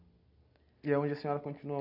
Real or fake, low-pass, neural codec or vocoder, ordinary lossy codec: real; 5.4 kHz; none; none